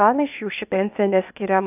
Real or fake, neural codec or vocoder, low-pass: fake; codec, 16 kHz, 0.8 kbps, ZipCodec; 3.6 kHz